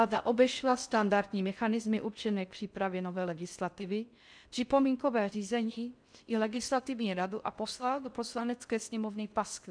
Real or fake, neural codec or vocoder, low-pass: fake; codec, 16 kHz in and 24 kHz out, 0.6 kbps, FocalCodec, streaming, 4096 codes; 9.9 kHz